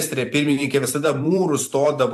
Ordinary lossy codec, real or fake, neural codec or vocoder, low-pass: AAC, 64 kbps; fake; vocoder, 44.1 kHz, 128 mel bands every 512 samples, BigVGAN v2; 14.4 kHz